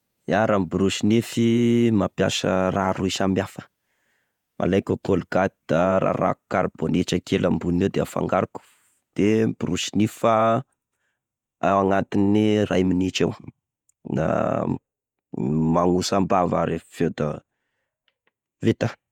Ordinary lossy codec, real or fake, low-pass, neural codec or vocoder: none; fake; 19.8 kHz; vocoder, 48 kHz, 128 mel bands, Vocos